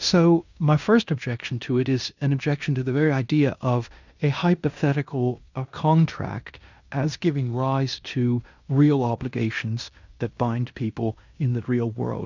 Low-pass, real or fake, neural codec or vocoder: 7.2 kHz; fake; codec, 16 kHz in and 24 kHz out, 0.9 kbps, LongCat-Audio-Codec, fine tuned four codebook decoder